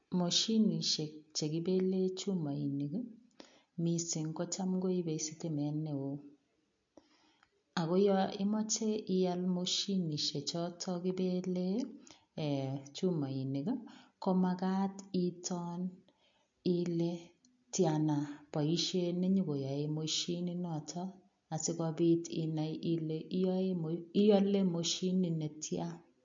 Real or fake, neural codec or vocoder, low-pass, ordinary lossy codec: real; none; 7.2 kHz; MP3, 48 kbps